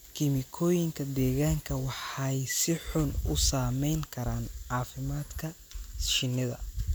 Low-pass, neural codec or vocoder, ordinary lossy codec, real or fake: none; none; none; real